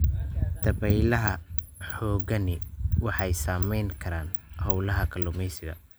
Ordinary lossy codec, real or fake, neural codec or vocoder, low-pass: none; real; none; none